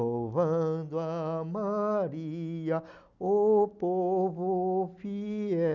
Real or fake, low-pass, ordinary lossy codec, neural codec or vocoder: real; 7.2 kHz; none; none